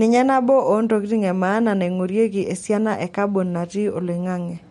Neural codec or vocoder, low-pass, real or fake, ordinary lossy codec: none; 19.8 kHz; real; MP3, 48 kbps